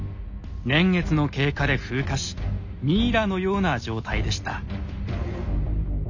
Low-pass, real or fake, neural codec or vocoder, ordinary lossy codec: 7.2 kHz; real; none; none